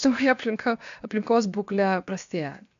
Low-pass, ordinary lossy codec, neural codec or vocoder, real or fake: 7.2 kHz; MP3, 64 kbps; codec, 16 kHz, 0.7 kbps, FocalCodec; fake